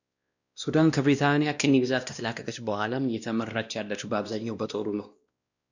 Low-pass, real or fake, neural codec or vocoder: 7.2 kHz; fake; codec, 16 kHz, 1 kbps, X-Codec, WavLM features, trained on Multilingual LibriSpeech